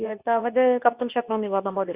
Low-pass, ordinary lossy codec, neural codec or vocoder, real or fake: 3.6 kHz; Opus, 64 kbps; codec, 24 kHz, 0.9 kbps, WavTokenizer, medium speech release version 2; fake